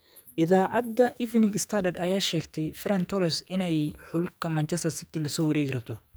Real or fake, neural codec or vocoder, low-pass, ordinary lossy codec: fake; codec, 44.1 kHz, 2.6 kbps, SNAC; none; none